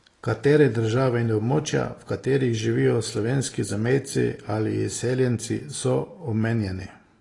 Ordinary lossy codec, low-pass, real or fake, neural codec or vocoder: AAC, 32 kbps; 10.8 kHz; real; none